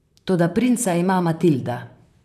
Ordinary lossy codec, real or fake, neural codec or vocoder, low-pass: none; fake; vocoder, 44.1 kHz, 128 mel bands, Pupu-Vocoder; 14.4 kHz